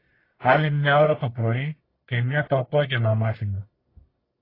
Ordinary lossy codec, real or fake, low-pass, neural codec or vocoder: AAC, 24 kbps; fake; 5.4 kHz; codec, 44.1 kHz, 1.7 kbps, Pupu-Codec